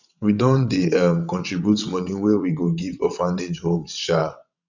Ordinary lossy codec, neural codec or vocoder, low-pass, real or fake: none; vocoder, 22.05 kHz, 80 mel bands, WaveNeXt; 7.2 kHz; fake